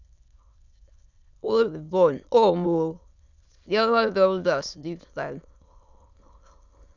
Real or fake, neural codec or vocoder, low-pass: fake; autoencoder, 22.05 kHz, a latent of 192 numbers a frame, VITS, trained on many speakers; 7.2 kHz